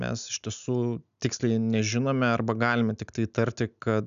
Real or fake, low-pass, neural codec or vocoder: real; 7.2 kHz; none